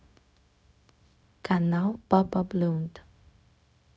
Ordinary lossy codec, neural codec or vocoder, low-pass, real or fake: none; codec, 16 kHz, 0.4 kbps, LongCat-Audio-Codec; none; fake